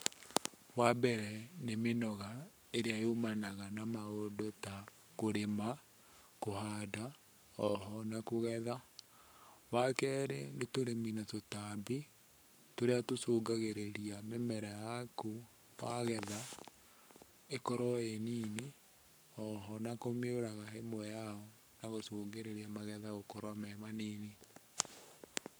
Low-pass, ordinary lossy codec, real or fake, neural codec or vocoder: none; none; fake; codec, 44.1 kHz, 7.8 kbps, Pupu-Codec